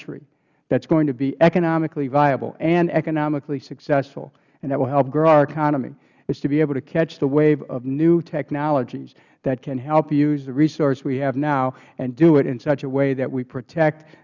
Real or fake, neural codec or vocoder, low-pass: real; none; 7.2 kHz